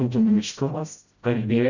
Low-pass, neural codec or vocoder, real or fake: 7.2 kHz; codec, 16 kHz, 0.5 kbps, FreqCodec, smaller model; fake